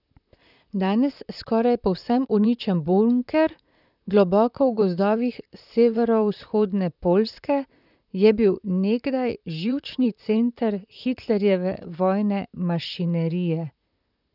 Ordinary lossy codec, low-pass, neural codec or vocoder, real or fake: none; 5.4 kHz; vocoder, 44.1 kHz, 128 mel bands, Pupu-Vocoder; fake